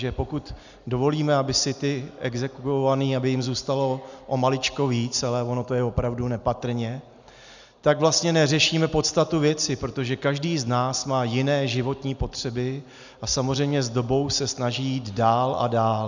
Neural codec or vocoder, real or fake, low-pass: none; real; 7.2 kHz